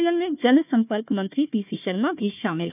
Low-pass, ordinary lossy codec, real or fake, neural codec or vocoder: 3.6 kHz; none; fake; codec, 16 kHz, 1 kbps, FunCodec, trained on Chinese and English, 50 frames a second